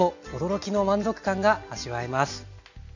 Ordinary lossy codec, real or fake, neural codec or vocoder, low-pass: none; real; none; 7.2 kHz